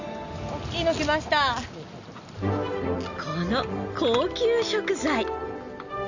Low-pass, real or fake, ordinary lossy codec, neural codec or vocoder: 7.2 kHz; real; Opus, 64 kbps; none